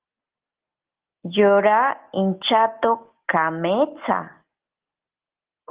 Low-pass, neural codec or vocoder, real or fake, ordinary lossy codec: 3.6 kHz; none; real; Opus, 16 kbps